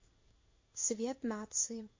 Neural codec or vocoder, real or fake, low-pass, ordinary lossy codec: codec, 16 kHz in and 24 kHz out, 1 kbps, XY-Tokenizer; fake; 7.2 kHz; MP3, 32 kbps